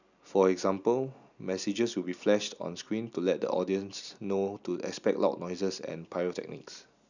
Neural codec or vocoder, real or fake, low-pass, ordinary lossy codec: none; real; 7.2 kHz; none